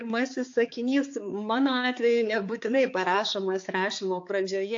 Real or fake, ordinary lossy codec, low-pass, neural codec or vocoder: fake; AAC, 48 kbps; 7.2 kHz; codec, 16 kHz, 4 kbps, X-Codec, HuBERT features, trained on balanced general audio